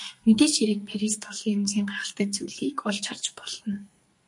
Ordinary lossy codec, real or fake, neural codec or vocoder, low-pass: MP3, 48 kbps; fake; codec, 44.1 kHz, 2.6 kbps, SNAC; 10.8 kHz